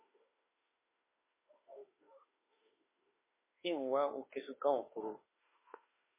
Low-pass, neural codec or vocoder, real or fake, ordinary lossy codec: 3.6 kHz; autoencoder, 48 kHz, 32 numbers a frame, DAC-VAE, trained on Japanese speech; fake; MP3, 16 kbps